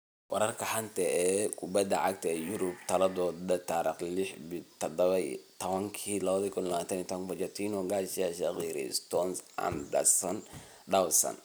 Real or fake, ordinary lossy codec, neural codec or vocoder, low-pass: real; none; none; none